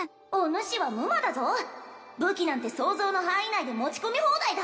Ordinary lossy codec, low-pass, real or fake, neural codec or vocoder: none; none; real; none